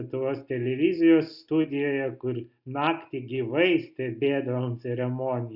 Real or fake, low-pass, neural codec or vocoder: real; 5.4 kHz; none